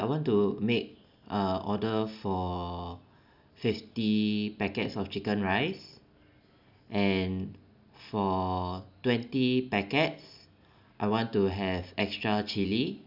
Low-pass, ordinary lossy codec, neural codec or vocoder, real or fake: 5.4 kHz; none; none; real